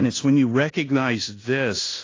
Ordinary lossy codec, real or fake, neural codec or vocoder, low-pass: AAC, 32 kbps; fake; codec, 16 kHz in and 24 kHz out, 0.9 kbps, LongCat-Audio-Codec, fine tuned four codebook decoder; 7.2 kHz